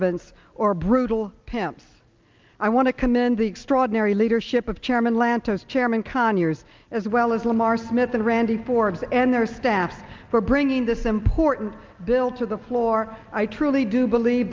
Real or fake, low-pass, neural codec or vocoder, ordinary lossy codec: real; 7.2 kHz; none; Opus, 24 kbps